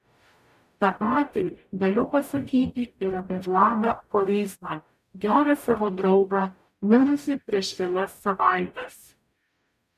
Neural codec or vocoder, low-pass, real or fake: codec, 44.1 kHz, 0.9 kbps, DAC; 14.4 kHz; fake